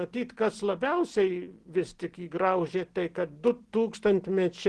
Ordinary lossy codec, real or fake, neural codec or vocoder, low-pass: Opus, 16 kbps; real; none; 10.8 kHz